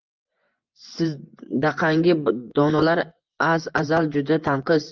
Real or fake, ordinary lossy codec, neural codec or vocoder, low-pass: fake; Opus, 32 kbps; vocoder, 24 kHz, 100 mel bands, Vocos; 7.2 kHz